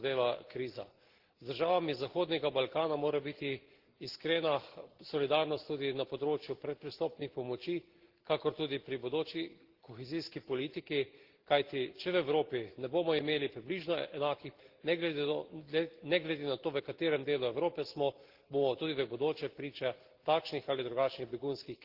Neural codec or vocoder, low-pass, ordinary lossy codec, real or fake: none; 5.4 kHz; Opus, 16 kbps; real